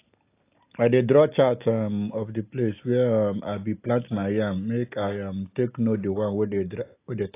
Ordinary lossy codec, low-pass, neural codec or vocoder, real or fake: AAC, 24 kbps; 3.6 kHz; none; real